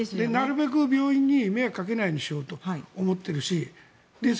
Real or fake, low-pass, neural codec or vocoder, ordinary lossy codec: real; none; none; none